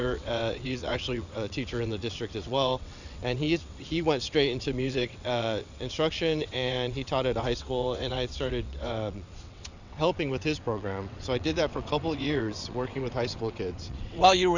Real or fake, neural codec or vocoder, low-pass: fake; vocoder, 22.05 kHz, 80 mel bands, WaveNeXt; 7.2 kHz